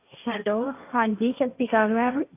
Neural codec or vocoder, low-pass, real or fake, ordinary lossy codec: codec, 16 kHz, 1.1 kbps, Voila-Tokenizer; 3.6 kHz; fake; AAC, 32 kbps